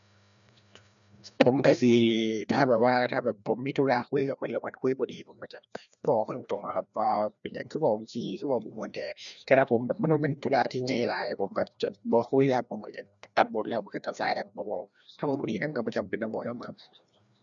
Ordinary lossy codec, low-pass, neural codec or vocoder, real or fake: MP3, 96 kbps; 7.2 kHz; codec, 16 kHz, 1 kbps, FreqCodec, larger model; fake